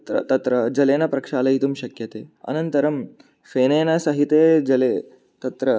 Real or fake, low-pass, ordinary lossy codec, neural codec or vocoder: real; none; none; none